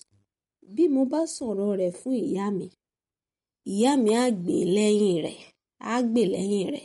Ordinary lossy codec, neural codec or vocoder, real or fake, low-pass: MP3, 48 kbps; none; real; 19.8 kHz